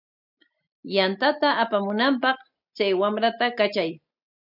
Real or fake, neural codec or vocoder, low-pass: real; none; 5.4 kHz